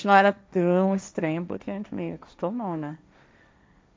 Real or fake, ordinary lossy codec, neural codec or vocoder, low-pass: fake; none; codec, 16 kHz, 1.1 kbps, Voila-Tokenizer; none